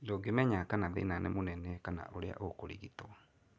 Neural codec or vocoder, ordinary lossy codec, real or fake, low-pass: none; none; real; none